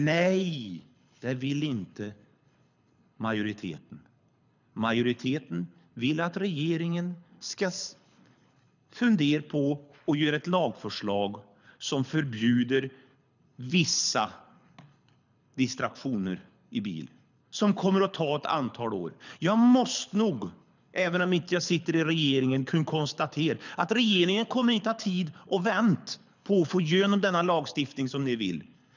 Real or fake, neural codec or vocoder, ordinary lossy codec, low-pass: fake; codec, 24 kHz, 6 kbps, HILCodec; none; 7.2 kHz